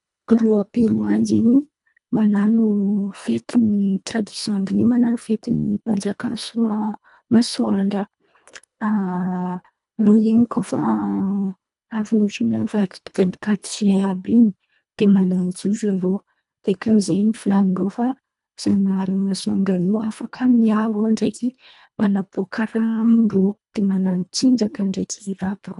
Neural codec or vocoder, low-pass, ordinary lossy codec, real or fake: codec, 24 kHz, 1.5 kbps, HILCodec; 10.8 kHz; none; fake